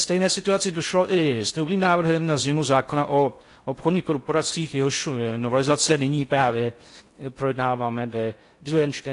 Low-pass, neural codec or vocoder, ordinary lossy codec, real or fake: 10.8 kHz; codec, 16 kHz in and 24 kHz out, 0.6 kbps, FocalCodec, streaming, 4096 codes; AAC, 48 kbps; fake